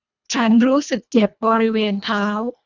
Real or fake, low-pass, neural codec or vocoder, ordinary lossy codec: fake; 7.2 kHz; codec, 24 kHz, 1.5 kbps, HILCodec; none